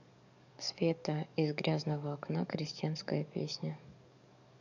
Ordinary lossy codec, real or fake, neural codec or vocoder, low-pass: none; fake; codec, 44.1 kHz, 7.8 kbps, DAC; 7.2 kHz